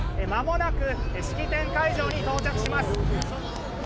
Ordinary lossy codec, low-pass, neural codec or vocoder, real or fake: none; none; none; real